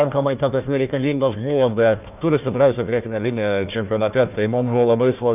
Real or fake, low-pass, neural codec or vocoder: fake; 3.6 kHz; codec, 16 kHz, 1 kbps, FunCodec, trained on Chinese and English, 50 frames a second